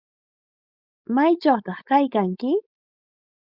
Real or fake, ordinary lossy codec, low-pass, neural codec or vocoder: fake; Opus, 64 kbps; 5.4 kHz; codec, 16 kHz, 4.8 kbps, FACodec